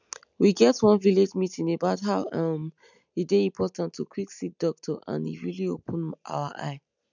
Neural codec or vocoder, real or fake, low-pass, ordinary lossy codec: none; real; 7.2 kHz; none